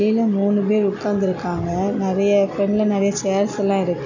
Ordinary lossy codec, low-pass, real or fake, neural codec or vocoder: none; 7.2 kHz; real; none